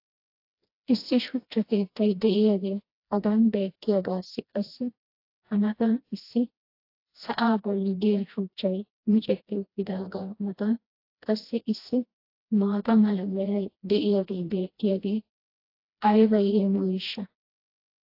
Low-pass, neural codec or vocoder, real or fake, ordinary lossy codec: 5.4 kHz; codec, 16 kHz, 1 kbps, FreqCodec, smaller model; fake; AAC, 32 kbps